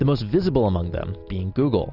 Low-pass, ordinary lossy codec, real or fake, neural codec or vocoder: 5.4 kHz; Opus, 64 kbps; real; none